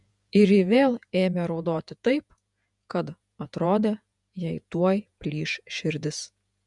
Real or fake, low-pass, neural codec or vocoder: fake; 10.8 kHz; vocoder, 48 kHz, 128 mel bands, Vocos